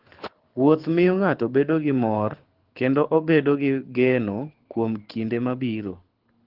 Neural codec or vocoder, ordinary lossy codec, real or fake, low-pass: codec, 24 kHz, 6 kbps, HILCodec; Opus, 16 kbps; fake; 5.4 kHz